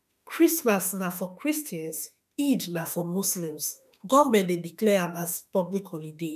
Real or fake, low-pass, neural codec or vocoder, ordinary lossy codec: fake; 14.4 kHz; autoencoder, 48 kHz, 32 numbers a frame, DAC-VAE, trained on Japanese speech; none